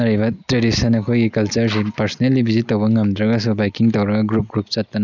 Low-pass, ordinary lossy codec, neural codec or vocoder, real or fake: 7.2 kHz; none; none; real